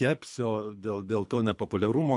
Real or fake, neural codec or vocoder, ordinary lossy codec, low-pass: fake; codec, 24 kHz, 3 kbps, HILCodec; MP3, 64 kbps; 10.8 kHz